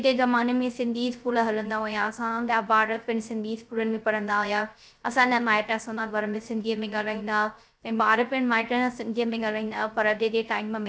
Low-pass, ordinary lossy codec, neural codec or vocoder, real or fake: none; none; codec, 16 kHz, 0.3 kbps, FocalCodec; fake